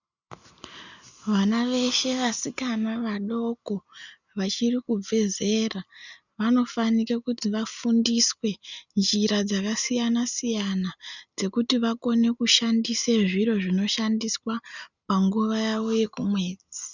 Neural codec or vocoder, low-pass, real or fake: none; 7.2 kHz; real